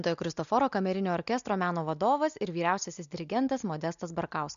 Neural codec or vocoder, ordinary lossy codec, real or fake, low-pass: none; MP3, 96 kbps; real; 7.2 kHz